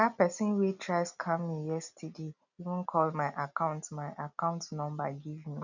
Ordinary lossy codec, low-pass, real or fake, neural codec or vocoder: none; 7.2 kHz; real; none